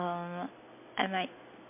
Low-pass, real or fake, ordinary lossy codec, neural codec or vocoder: 3.6 kHz; real; MP3, 24 kbps; none